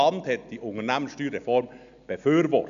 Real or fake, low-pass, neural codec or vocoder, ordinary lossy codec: real; 7.2 kHz; none; none